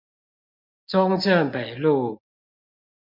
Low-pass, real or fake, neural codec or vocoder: 5.4 kHz; real; none